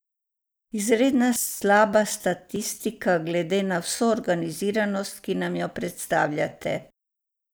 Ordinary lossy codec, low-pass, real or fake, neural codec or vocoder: none; none; real; none